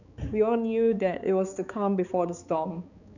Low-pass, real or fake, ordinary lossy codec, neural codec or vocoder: 7.2 kHz; fake; none; codec, 16 kHz, 4 kbps, X-Codec, HuBERT features, trained on balanced general audio